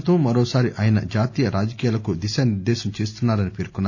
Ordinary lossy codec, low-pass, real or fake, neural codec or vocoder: MP3, 64 kbps; 7.2 kHz; real; none